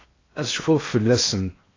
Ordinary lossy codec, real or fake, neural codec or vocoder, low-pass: AAC, 32 kbps; fake; codec, 16 kHz in and 24 kHz out, 0.8 kbps, FocalCodec, streaming, 65536 codes; 7.2 kHz